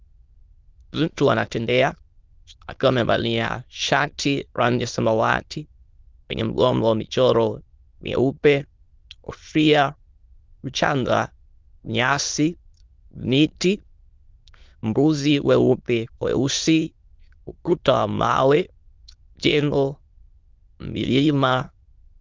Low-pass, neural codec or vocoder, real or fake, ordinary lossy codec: 7.2 kHz; autoencoder, 22.05 kHz, a latent of 192 numbers a frame, VITS, trained on many speakers; fake; Opus, 32 kbps